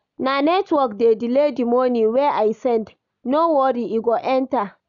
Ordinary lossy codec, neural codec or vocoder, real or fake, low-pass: MP3, 96 kbps; none; real; 7.2 kHz